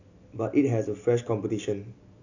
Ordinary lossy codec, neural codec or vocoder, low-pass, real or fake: none; none; 7.2 kHz; real